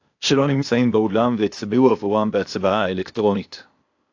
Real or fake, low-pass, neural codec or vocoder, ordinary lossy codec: fake; 7.2 kHz; codec, 16 kHz, 0.8 kbps, ZipCodec; AAC, 48 kbps